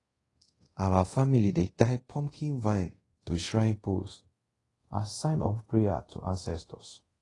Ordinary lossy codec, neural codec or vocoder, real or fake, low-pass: AAC, 32 kbps; codec, 24 kHz, 0.5 kbps, DualCodec; fake; 10.8 kHz